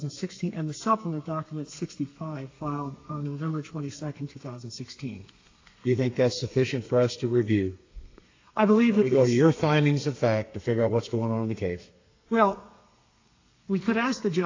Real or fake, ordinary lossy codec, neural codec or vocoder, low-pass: fake; AAC, 32 kbps; codec, 32 kHz, 1.9 kbps, SNAC; 7.2 kHz